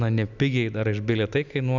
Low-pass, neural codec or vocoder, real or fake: 7.2 kHz; none; real